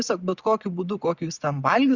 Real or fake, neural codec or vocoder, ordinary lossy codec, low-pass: real; none; Opus, 64 kbps; 7.2 kHz